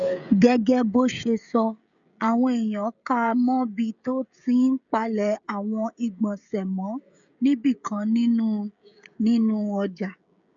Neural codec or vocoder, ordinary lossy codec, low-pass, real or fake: codec, 16 kHz, 16 kbps, FreqCodec, smaller model; none; 7.2 kHz; fake